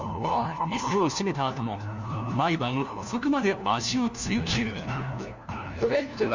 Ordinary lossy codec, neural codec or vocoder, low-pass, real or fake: none; codec, 16 kHz, 1 kbps, FunCodec, trained on LibriTTS, 50 frames a second; 7.2 kHz; fake